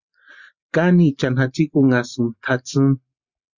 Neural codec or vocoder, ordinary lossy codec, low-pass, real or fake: none; Opus, 64 kbps; 7.2 kHz; real